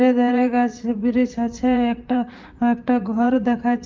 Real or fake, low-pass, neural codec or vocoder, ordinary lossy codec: fake; 7.2 kHz; vocoder, 44.1 kHz, 128 mel bands, Pupu-Vocoder; Opus, 24 kbps